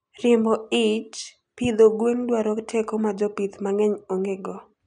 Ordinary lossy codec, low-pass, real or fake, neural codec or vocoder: none; 9.9 kHz; real; none